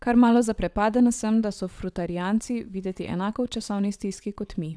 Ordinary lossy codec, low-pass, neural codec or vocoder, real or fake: none; none; vocoder, 22.05 kHz, 80 mel bands, WaveNeXt; fake